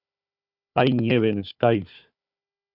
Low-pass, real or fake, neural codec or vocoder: 5.4 kHz; fake; codec, 16 kHz, 4 kbps, FunCodec, trained on Chinese and English, 50 frames a second